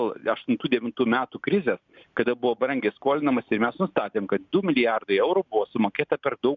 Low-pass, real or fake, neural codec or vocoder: 7.2 kHz; real; none